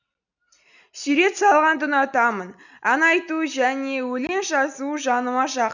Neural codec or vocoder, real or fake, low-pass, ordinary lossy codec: none; real; 7.2 kHz; none